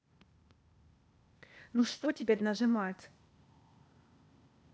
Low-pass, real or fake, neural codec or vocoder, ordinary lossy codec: none; fake; codec, 16 kHz, 0.8 kbps, ZipCodec; none